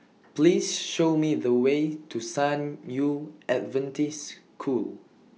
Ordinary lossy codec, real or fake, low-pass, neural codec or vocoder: none; real; none; none